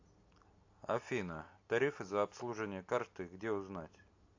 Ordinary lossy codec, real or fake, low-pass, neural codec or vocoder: AAC, 48 kbps; real; 7.2 kHz; none